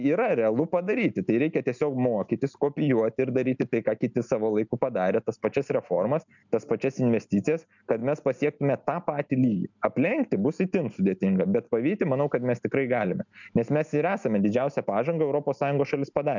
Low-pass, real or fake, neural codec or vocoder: 7.2 kHz; real; none